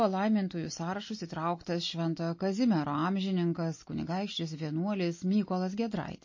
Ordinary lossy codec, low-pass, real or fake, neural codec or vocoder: MP3, 32 kbps; 7.2 kHz; real; none